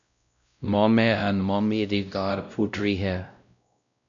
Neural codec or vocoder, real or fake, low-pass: codec, 16 kHz, 0.5 kbps, X-Codec, WavLM features, trained on Multilingual LibriSpeech; fake; 7.2 kHz